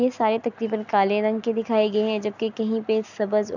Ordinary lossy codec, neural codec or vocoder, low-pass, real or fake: none; none; 7.2 kHz; real